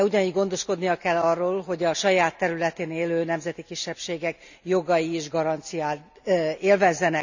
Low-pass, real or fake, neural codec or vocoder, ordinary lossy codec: 7.2 kHz; real; none; none